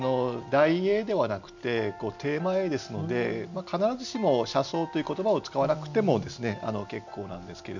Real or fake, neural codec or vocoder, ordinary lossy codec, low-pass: real; none; none; 7.2 kHz